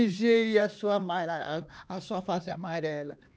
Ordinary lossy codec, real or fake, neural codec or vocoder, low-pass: none; fake; codec, 16 kHz, 2 kbps, X-Codec, HuBERT features, trained on LibriSpeech; none